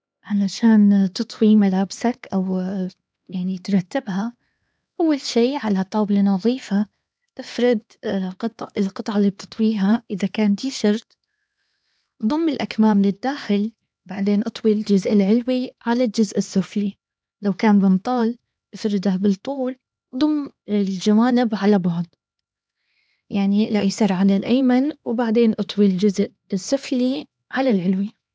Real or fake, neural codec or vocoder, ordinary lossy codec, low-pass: fake; codec, 16 kHz, 2 kbps, X-Codec, HuBERT features, trained on LibriSpeech; none; none